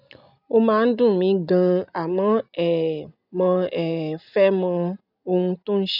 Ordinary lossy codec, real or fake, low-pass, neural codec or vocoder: none; real; 5.4 kHz; none